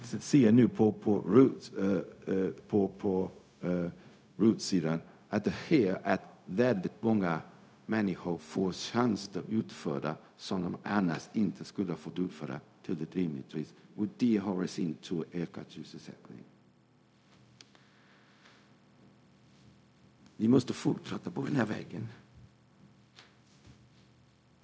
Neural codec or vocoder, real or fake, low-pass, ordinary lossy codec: codec, 16 kHz, 0.4 kbps, LongCat-Audio-Codec; fake; none; none